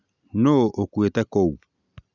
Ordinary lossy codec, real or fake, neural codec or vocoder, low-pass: none; real; none; 7.2 kHz